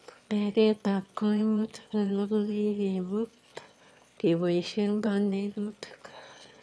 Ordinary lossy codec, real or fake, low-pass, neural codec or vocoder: none; fake; none; autoencoder, 22.05 kHz, a latent of 192 numbers a frame, VITS, trained on one speaker